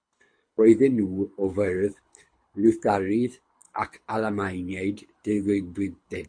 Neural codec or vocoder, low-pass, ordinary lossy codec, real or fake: codec, 24 kHz, 6 kbps, HILCodec; 9.9 kHz; MP3, 48 kbps; fake